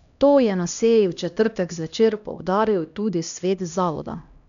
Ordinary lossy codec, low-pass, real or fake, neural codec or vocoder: none; 7.2 kHz; fake; codec, 16 kHz, 1 kbps, X-Codec, HuBERT features, trained on LibriSpeech